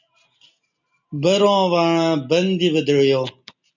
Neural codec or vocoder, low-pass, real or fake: none; 7.2 kHz; real